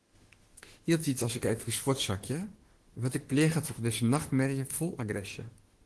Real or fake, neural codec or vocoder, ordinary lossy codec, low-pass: fake; autoencoder, 48 kHz, 32 numbers a frame, DAC-VAE, trained on Japanese speech; Opus, 16 kbps; 10.8 kHz